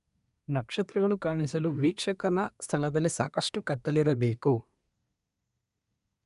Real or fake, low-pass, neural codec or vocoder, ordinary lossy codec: fake; 10.8 kHz; codec, 24 kHz, 1 kbps, SNAC; none